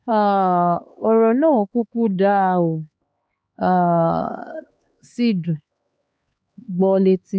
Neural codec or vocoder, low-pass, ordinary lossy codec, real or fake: codec, 16 kHz, 2 kbps, X-Codec, HuBERT features, trained on LibriSpeech; none; none; fake